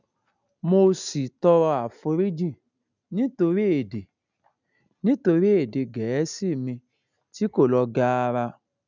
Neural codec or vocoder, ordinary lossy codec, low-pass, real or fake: none; none; 7.2 kHz; real